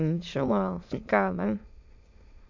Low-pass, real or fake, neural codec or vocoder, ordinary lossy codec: 7.2 kHz; fake; autoencoder, 22.05 kHz, a latent of 192 numbers a frame, VITS, trained on many speakers; MP3, 64 kbps